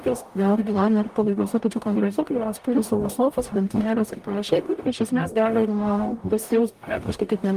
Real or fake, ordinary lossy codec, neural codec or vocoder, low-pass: fake; Opus, 32 kbps; codec, 44.1 kHz, 0.9 kbps, DAC; 14.4 kHz